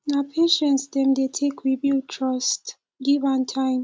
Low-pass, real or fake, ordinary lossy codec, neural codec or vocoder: none; real; none; none